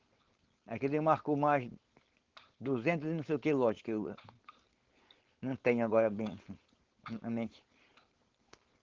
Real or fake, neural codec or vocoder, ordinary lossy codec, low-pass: fake; codec, 16 kHz, 4.8 kbps, FACodec; Opus, 16 kbps; 7.2 kHz